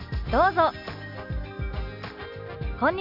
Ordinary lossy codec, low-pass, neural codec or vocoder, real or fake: none; 5.4 kHz; none; real